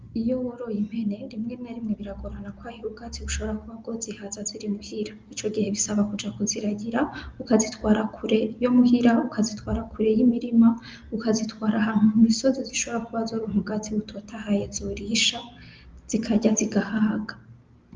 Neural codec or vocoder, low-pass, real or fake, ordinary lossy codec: none; 7.2 kHz; real; Opus, 32 kbps